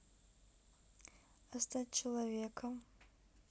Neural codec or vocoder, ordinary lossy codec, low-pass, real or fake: none; none; none; real